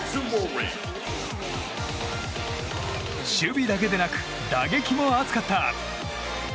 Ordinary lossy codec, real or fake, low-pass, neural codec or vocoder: none; real; none; none